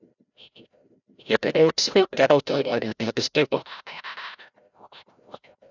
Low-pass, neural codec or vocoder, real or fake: 7.2 kHz; codec, 16 kHz, 0.5 kbps, FreqCodec, larger model; fake